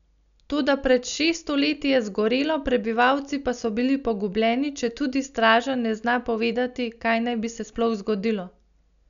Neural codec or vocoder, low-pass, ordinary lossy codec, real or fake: none; 7.2 kHz; none; real